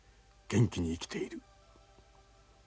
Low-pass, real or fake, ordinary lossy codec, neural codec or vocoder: none; real; none; none